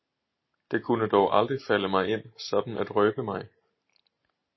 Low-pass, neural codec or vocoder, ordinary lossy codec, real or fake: 7.2 kHz; none; MP3, 24 kbps; real